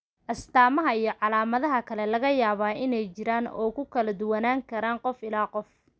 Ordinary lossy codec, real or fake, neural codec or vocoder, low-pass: none; real; none; none